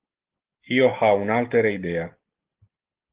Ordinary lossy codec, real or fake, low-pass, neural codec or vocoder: Opus, 16 kbps; real; 3.6 kHz; none